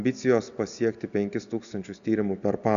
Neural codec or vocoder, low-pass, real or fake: none; 7.2 kHz; real